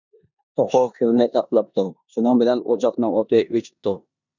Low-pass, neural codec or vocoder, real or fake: 7.2 kHz; codec, 16 kHz in and 24 kHz out, 0.9 kbps, LongCat-Audio-Codec, four codebook decoder; fake